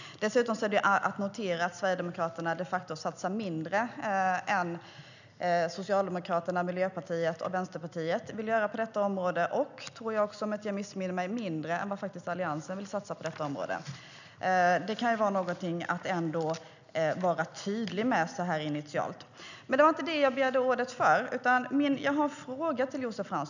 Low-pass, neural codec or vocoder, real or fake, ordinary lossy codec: 7.2 kHz; none; real; none